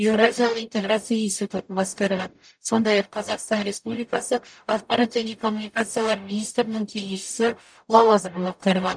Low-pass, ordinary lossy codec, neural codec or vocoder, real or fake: 9.9 kHz; none; codec, 44.1 kHz, 0.9 kbps, DAC; fake